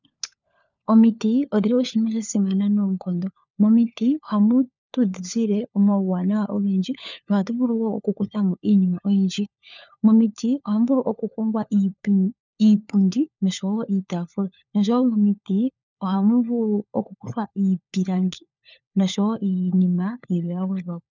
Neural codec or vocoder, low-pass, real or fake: codec, 16 kHz, 4 kbps, FunCodec, trained on LibriTTS, 50 frames a second; 7.2 kHz; fake